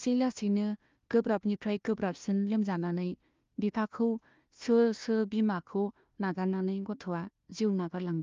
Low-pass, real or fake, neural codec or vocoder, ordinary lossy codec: 7.2 kHz; fake; codec, 16 kHz, 1 kbps, FunCodec, trained on Chinese and English, 50 frames a second; Opus, 32 kbps